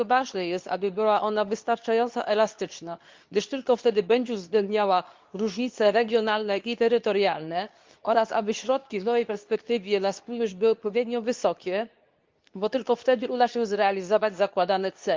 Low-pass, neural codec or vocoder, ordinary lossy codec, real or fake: 7.2 kHz; codec, 24 kHz, 0.9 kbps, WavTokenizer, medium speech release version 2; Opus, 24 kbps; fake